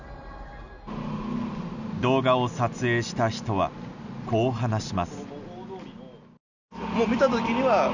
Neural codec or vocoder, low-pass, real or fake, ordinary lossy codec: none; 7.2 kHz; real; none